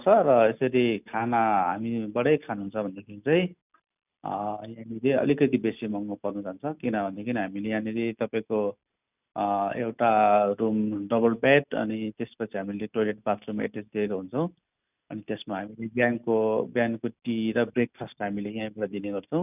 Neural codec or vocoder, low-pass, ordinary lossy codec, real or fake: none; 3.6 kHz; none; real